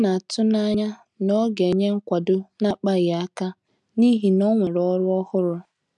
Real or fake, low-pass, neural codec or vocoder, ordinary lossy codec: real; 10.8 kHz; none; none